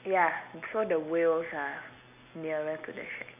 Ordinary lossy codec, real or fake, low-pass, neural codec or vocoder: none; real; 3.6 kHz; none